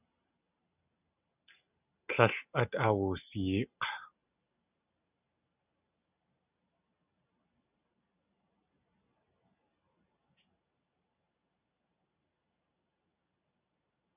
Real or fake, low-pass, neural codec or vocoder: real; 3.6 kHz; none